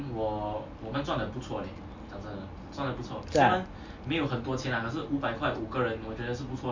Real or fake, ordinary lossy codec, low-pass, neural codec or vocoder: real; MP3, 48 kbps; 7.2 kHz; none